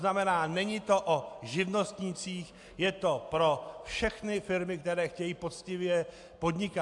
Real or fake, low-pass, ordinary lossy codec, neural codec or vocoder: real; 10.8 kHz; AAC, 64 kbps; none